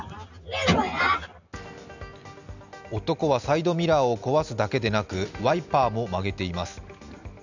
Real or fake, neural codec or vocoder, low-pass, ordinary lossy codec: real; none; 7.2 kHz; none